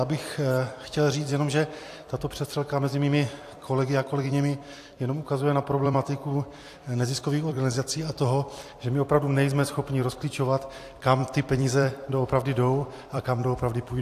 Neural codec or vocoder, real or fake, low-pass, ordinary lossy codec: vocoder, 44.1 kHz, 128 mel bands every 256 samples, BigVGAN v2; fake; 14.4 kHz; AAC, 64 kbps